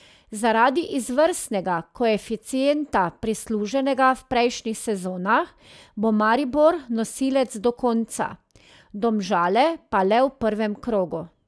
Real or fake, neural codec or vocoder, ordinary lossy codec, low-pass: real; none; none; none